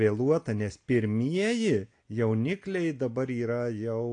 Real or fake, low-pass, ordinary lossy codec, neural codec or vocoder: real; 9.9 kHz; AAC, 48 kbps; none